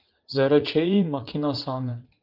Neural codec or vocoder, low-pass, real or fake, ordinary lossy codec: vocoder, 44.1 kHz, 80 mel bands, Vocos; 5.4 kHz; fake; Opus, 32 kbps